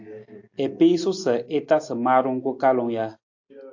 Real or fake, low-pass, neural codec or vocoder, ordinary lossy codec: real; 7.2 kHz; none; AAC, 48 kbps